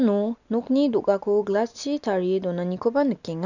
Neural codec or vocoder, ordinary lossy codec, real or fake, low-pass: none; none; real; 7.2 kHz